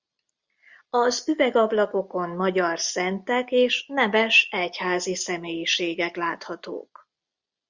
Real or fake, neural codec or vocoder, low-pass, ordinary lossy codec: real; none; 7.2 kHz; Opus, 64 kbps